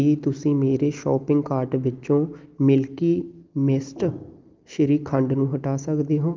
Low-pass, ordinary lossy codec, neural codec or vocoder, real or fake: 7.2 kHz; Opus, 32 kbps; none; real